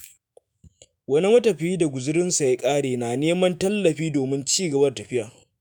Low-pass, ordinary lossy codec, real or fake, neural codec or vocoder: none; none; fake; autoencoder, 48 kHz, 128 numbers a frame, DAC-VAE, trained on Japanese speech